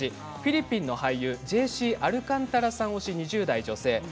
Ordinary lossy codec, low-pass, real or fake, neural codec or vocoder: none; none; real; none